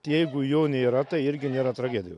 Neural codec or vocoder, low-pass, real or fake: none; 10.8 kHz; real